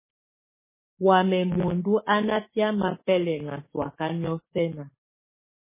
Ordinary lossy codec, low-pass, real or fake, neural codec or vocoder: MP3, 16 kbps; 3.6 kHz; fake; codec, 44.1 kHz, 7.8 kbps, DAC